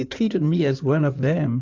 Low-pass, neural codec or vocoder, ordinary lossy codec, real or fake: 7.2 kHz; codec, 16 kHz in and 24 kHz out, 2.2 kbps, FireRedTTS-2 codec; AAC, 48 kbps; fake